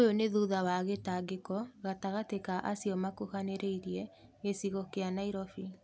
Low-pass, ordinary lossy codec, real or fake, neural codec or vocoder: none; none; real; none